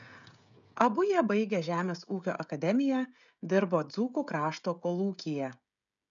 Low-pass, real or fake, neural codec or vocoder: 7.2 kHz; fake; codec, 16 kHz, 16 kbps, FreqCodec, smaller model